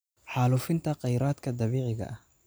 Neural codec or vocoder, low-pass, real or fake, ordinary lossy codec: none; none; real; none